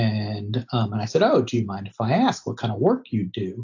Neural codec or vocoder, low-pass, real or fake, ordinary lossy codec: none; 7.2 kHz; real; AAC, 48 kbps